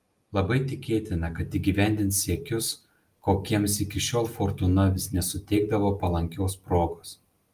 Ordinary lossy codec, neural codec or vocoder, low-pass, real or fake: Opus, 32 kbps; none; 14.4 kHz; real